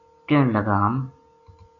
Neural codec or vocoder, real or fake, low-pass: none; real; 7.2 kHz